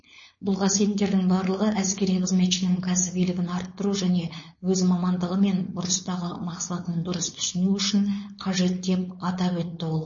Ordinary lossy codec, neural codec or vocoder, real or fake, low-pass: MP3, 32 kbps; codec, 16 kHz, 4.8 kbps, FACodec; fake; 7.2 kHz